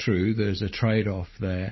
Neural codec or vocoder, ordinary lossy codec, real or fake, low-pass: none; MP3, 24 kbps; real; 7.2 kHz